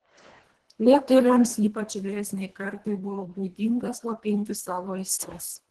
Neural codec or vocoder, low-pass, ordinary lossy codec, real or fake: codec, 24 kHz, 1.5 kbps, HILCodec; 10.8 kHz; Opus, 16 kbps; fake